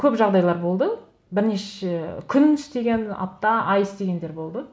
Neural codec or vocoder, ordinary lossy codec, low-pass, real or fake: none; none; none; real